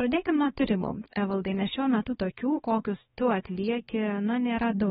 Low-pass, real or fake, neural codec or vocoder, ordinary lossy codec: 7.2 kHz; fake; codec, 16 kHz, 4 kbps, X-Codec, HuBERT features, trained on balanced general audio; AAC, 16 kbps